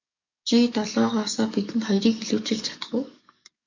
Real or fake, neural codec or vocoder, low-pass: fake; codec, 44.1 kHz, 7.8 kbps, DAC; 7.2 kHz